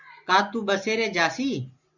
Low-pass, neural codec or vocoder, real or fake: 7.2 kHz; none; real